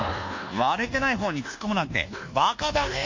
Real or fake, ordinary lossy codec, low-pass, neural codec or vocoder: fake; MP3, 64 kbps; 7.2 kHz; codec, 24 kHz, 1.2 kbps, DualCodec